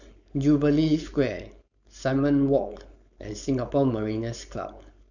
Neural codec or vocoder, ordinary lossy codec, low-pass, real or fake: codec, 16 kHz, 4.8 kbps, FACodec; none; 7.2 kHz; fake